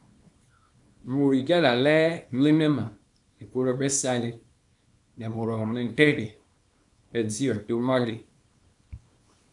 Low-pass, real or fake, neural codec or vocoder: 10.8 kHz; fake; codec, 24 kHz, 0.9 kbps, WavTokenizer, small release